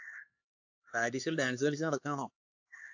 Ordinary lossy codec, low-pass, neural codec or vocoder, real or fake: AAC, 48 kbps; 7.2 kHz; codec, 16 kHz, 4 kbps, X-Codec, HuBERT features, trained on LibriSpeech; fake